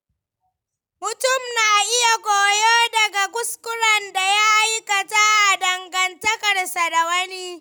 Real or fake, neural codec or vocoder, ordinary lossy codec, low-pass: real; none; none; none